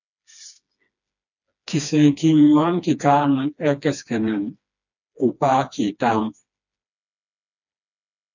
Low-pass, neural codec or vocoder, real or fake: 7.2 kHz; codec, 16 kHz, 2 kbps, FreqCodec, smaller model; fake